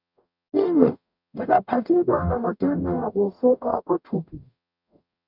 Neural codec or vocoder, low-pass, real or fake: codec, 44.1 kHz, 0.9 kbps, DAC; 5.4 kHz; fake